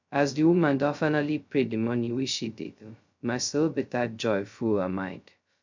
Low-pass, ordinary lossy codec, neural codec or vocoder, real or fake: 7.2 kHz; MP3, 64 kbps; codec, 16 kHz, 0.2 kbps, FocalCodec; fake